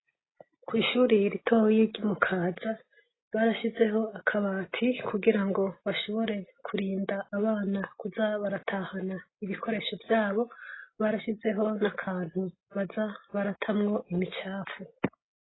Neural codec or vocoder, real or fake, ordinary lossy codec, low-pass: none; real; AAC, 16 kbps; 7.2 kHz